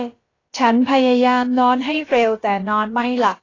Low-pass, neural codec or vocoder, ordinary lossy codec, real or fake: 7.2 kHz; codec, 16 kHz, about 1 kbps, DyCAST, with the encoder's durations; AAC, 32 kbps; fake